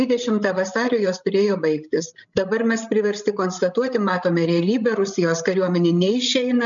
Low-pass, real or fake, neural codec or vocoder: 7.2 kHz; fake; codec, 16 kHz, 16 kbps, FreqCodec, larger model